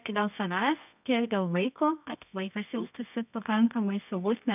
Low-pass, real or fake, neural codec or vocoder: 3.6 kHz; fake; codec, 24 kHz, 0.9 kbps, WavTokenizer, medium music audio release